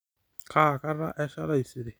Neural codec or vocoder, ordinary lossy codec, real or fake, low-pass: none; none; real; none